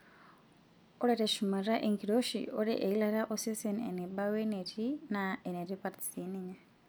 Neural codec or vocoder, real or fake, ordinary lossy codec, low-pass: none; real; none; none